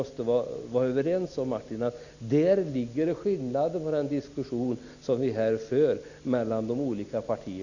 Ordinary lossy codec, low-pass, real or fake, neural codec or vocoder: none; 7.2 kHz; real; none